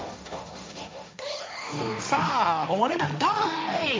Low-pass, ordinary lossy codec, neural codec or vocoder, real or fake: none; none; codec, 16 kHz, 1.1 kbps, Voila-Tokenizer; fake